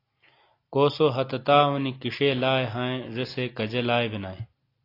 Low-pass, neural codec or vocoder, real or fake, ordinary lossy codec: 5.4 kHz; none; real; AAC, 32 kbps